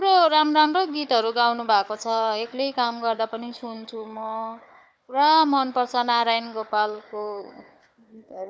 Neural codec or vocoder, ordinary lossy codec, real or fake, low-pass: codec, 16 kHz, 4 kbps, FunCodec, trained on Chinese and English, 50 frames a second; none; fake; none